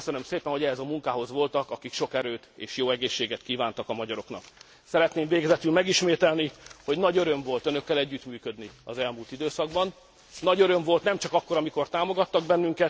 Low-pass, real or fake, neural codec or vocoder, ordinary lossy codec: none; real; none; none